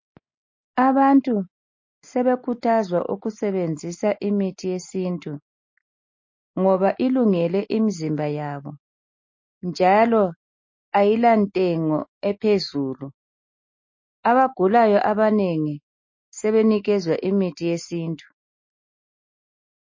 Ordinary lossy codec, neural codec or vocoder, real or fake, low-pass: MP3, 32 kbps; none; real; 7.2 kHz